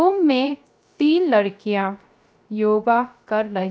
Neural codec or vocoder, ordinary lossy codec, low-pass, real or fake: codec, 16 kHz, 0.3 kbps, FocalCodec; none; none; fake